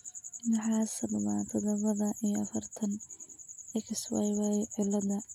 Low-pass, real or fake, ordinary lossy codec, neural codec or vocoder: 19.8 kHz; real; none; none